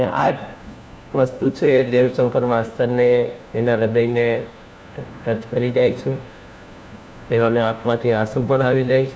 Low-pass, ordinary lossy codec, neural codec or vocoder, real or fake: none; none; codec, 16 kHz, 1 kbps, FunCodec, trained on LibriTTS, 50 frames a second; fake